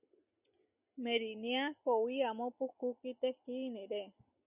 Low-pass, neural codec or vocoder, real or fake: 3.6 kHz; none; real